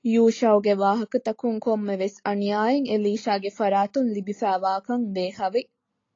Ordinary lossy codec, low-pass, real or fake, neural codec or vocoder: AAC, 32 kbps; 7.2 kHz; real; none